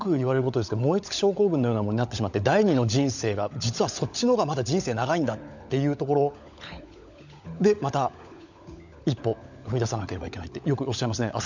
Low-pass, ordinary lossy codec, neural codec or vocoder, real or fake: 7.2 kHz; none; codec, 16 kHz, 16 kbps, FunCodec, trained on LibriTTS, 50 frames a second; fake